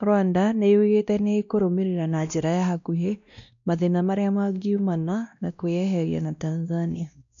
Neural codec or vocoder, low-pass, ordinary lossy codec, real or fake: codec, 16 kHz, 1 kbps, X-Codec, WavLM features, trained on Multilingual LibriSpeech; 7.2 kHz; none; fake